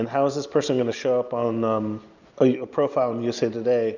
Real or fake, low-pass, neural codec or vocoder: real; 7.2 kHz; none